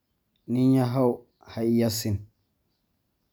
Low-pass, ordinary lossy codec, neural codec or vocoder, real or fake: none; none; none; real